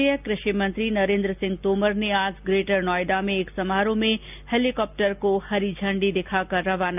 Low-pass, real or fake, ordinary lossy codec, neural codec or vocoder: 3.6 kHz; real; none; none